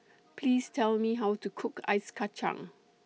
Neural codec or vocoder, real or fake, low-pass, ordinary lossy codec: none; real; none; none